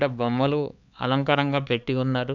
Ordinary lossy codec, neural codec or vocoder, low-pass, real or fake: none; codec, 16 kHz, 4 kbps, X-Codec, HuBERT features, trained on LibriSpeech; 7.2 kHz; fake